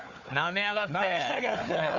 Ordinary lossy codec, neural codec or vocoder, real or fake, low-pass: Opus, 64 kbps; codec, 16 kHz, 4 kbps, FunCodec, trained on Chinese and English, 50 frames a second; fake; 7.2 kHz